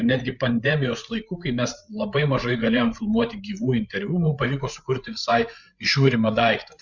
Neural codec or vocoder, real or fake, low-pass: codec, 16 kHz, 8 kbps, FreqCodec, larger model; fake; 7.2 kHz